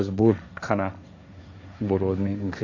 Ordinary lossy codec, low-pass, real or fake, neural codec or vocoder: none; 7.2 kHz; fake; codec, 16 kHz, 1.1 kbps, Voila-Tokenizer